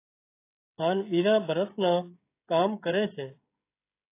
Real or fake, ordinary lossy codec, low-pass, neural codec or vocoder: fake; AAC, 24 kbps; 3.6 kHz; codec, 16 kHz, 16 kbps, FreqCodec, smaller model